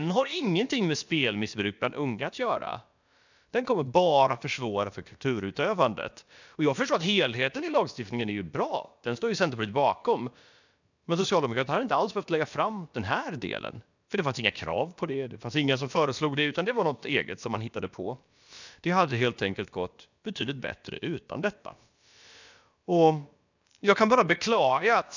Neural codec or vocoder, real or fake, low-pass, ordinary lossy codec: codec, 16 kHz, about 1 kbps, DyCAST, with the encoder's durations; fake; 7.2 kHz; none